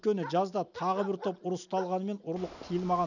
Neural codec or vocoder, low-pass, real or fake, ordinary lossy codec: none; 7.2 kHz; real; none